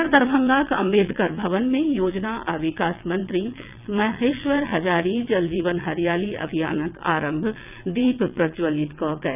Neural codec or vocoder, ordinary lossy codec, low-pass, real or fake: vocoder, 22.05 kHz, 80 mel bands, WaveNeXt; none; 3.6 kHz; fake